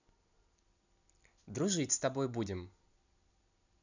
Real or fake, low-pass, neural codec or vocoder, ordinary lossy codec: real; 7.2 kHz; none; none